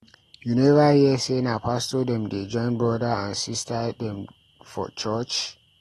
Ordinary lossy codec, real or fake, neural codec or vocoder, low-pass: AAC, 32 kbps; real; none; 19.8 kHz